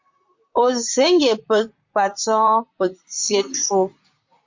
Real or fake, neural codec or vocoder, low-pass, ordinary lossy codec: fake; vocoder, 44.1 kHz, 128 mel bands, Pupu-Vocoder; 7.2 kHz; MP3, 48 kbps